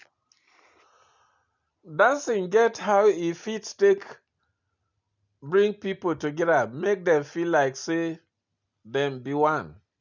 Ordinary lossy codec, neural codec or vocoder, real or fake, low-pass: none; none; real; 7.2 kHz